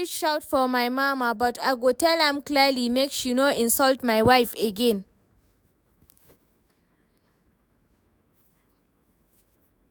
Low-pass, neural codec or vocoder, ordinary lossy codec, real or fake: none; autoencoder, 48 kHz, 128 numbers a frame, DAC-VAE, trained on Japanese speech; none; fake